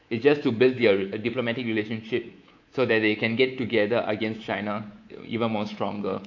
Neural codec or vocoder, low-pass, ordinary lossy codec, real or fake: codec, 24 kHz, 3.1 kbps, DualCodec; 7.2 kHz; AAC, 48 kbps; fake